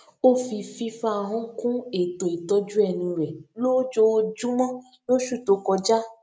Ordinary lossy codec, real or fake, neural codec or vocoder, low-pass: none; real; none; none